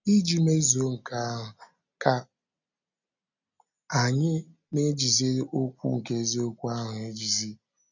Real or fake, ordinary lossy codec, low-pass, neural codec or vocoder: real; none; 7.2 kHz; none